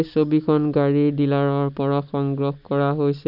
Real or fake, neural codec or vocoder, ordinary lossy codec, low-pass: real; none; none; 5.4 kHz